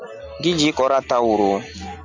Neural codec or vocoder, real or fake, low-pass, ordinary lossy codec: none; real; 7.2 kHz; MP3, 64 kbps